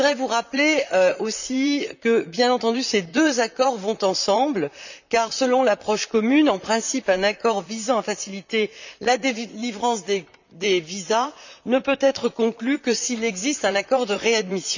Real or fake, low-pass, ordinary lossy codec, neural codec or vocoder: fake; 7.2 kHz; none; vocoder, 44.1 kHz, 128 mel bands, Pupu-Vocoder